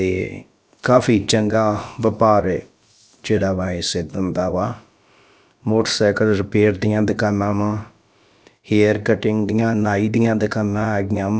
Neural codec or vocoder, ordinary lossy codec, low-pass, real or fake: codec, 16 kHz, about 1 kbps, DyCAST, with the encoder's durations; none; none; fake